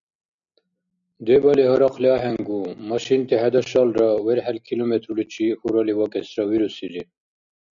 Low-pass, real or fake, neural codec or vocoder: 7.2 kHz; real; none